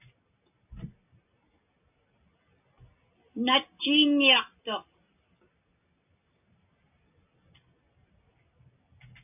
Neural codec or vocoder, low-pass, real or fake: none; 3.6 kHz; real